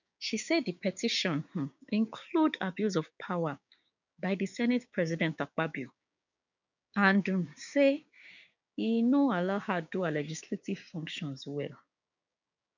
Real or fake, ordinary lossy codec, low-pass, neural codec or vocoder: fake; none; 7.2 kHz; codec, 16 kHz, 6 kbps, DAC